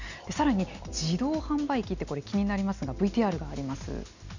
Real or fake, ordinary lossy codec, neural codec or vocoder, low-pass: real; none; none; 7.2 kHz